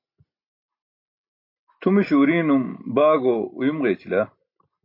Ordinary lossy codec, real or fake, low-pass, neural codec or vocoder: MP3, 32 kbps; real; 5.4 kHz; none